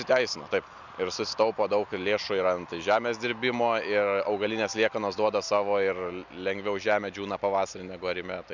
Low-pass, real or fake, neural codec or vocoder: 7.2 kHz; real; none